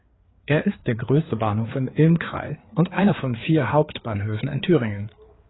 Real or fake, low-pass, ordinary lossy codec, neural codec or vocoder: fake; 7.2 kHz; AAC, 16 kbps; codec, 16 kHz, 4 kbps, FreqCodec, larger model